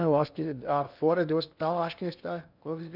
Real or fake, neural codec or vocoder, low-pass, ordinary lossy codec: fake; codec, 16 kHz in and 24 kHz out, 0.6 kbps, FocalCodec, streaming, 2048 codes; 5.4 kHz; none